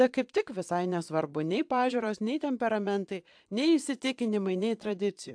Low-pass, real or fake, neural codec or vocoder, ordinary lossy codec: 9.9 kHz; fake; vocoder, 22.05 kHz, 80 mel bands, Vocos; AAC, 64 kbps